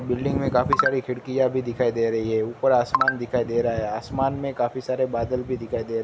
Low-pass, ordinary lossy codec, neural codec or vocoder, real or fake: none; none; none; real